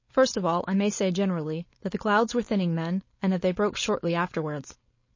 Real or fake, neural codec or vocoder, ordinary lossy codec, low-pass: fake; codec, 16 kHz, 4.8 kbps, FACodec; MP3, 32 kbps; 7.2 kHz